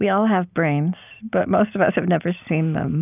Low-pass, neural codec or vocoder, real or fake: 3.6 kHz; none; real